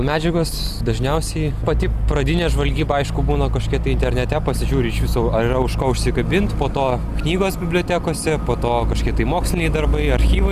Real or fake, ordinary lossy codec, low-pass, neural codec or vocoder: real; Opus, 64 kbps; 14.4 kHz; none